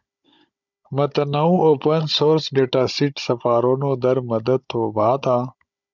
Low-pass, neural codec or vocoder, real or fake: 7.2 kHz; codec, 16 kHz, 16 kbps, FunCodec, trained on Chinese and English, 50 frames a second; fake